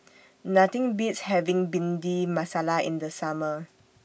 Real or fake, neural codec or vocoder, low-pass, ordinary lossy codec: real; none; none; none